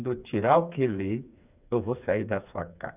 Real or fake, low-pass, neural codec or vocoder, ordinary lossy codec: fake; 3.6 kHz; codec, 16 kHz, 4 kbps, FreqCodec, smaller model; none